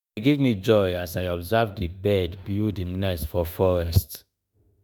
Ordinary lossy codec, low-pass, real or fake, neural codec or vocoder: none; none; fake; autoencoder, 48 kHz, 32 numbers a frame, DAC-VAE, trained on Japanese speech